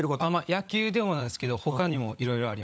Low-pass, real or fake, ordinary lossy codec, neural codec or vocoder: none; fake; none; codec, 16 kHz, 16 kbps, FunCodec, trained on LibriTTS, 50 frames a second